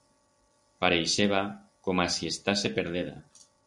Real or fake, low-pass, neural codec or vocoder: real; 10.8 kHz; none